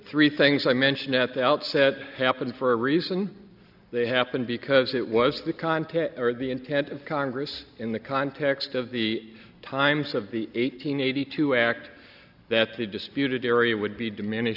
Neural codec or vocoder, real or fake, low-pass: none; real; 5.4 kHz